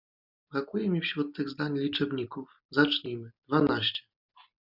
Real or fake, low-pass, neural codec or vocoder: real; 5.4 kHz; none